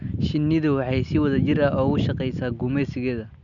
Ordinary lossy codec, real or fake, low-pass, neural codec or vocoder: none; real; 7.2 kHz; none